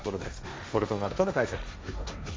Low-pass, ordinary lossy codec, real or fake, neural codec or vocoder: none; none; fake; codec, 16 kHz, 1.1 kbps, Voila-Tokenizer